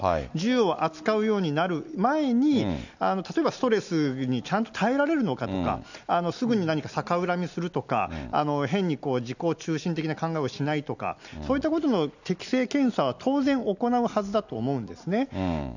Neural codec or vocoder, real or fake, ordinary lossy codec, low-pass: none; real; none; 7.2 kHz